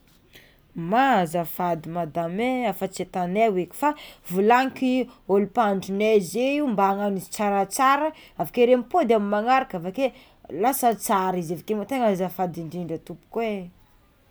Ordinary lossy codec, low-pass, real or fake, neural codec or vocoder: none; none; real; none